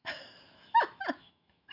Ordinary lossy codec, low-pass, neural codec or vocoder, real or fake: none; 5.4 kHz; autoencoder, 48 kHz, 128 numbers a frame, DAC-VAE, trained on Japanese speech; fake